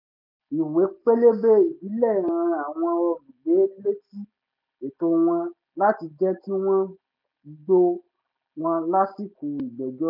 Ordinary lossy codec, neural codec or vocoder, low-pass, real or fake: none; none; 5.4 kHz; real